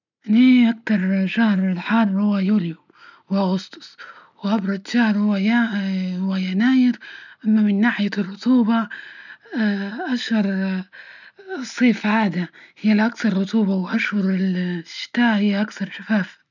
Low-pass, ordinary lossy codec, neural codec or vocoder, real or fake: 7.2 kHz; none; none; real